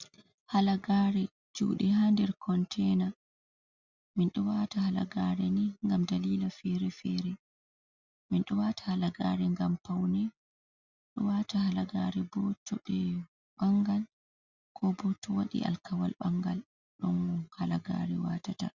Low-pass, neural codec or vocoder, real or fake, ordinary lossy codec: 7.2 kHz; none; real; Opus, 64 kbps